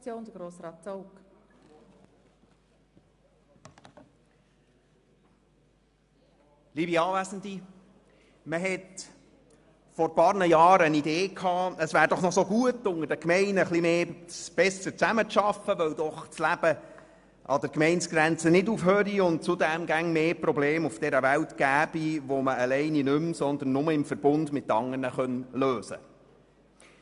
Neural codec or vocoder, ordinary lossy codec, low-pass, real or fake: none; AAC, 96 kbps; 10.8 kHz; real